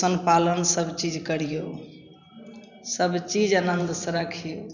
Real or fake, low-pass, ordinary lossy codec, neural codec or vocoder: fake; 7.2 kHz; none; vocoder, 44.1 kHz, 128 mel bands every 512 samples, BigVGAN v2